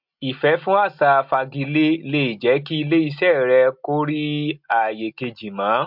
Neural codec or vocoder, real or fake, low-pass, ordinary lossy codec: none; real; 5.4 kHz; MP3, 48 kbps